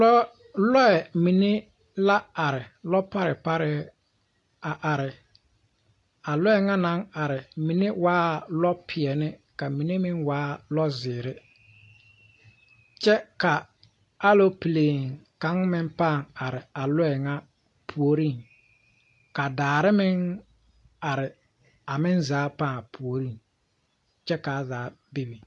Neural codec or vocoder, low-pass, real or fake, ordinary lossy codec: none; 10.8 kHz; real; AAC, 48 kbps